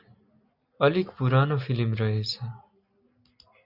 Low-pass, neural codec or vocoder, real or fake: 5.4 kHz; none; real